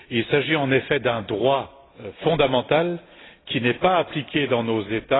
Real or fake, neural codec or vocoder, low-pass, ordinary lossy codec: real; none; 7.2 kHz; AAC, 16 kbps